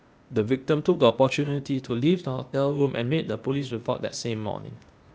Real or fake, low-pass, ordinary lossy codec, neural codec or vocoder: fake; none; none; codec, 16 kHz, 0.8 kbps, ZipCodec